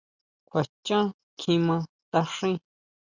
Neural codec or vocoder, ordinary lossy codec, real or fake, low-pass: none; Opus, 64 kbps; real; 7.2 kHz